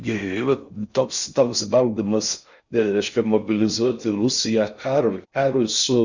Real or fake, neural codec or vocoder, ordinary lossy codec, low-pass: fake; codec, 16 kHz in and 24 kHz out, 0.6 kbps, FocalCodec, streaming, 4096 codes; Opus, 64 kbps; 7.2 kHz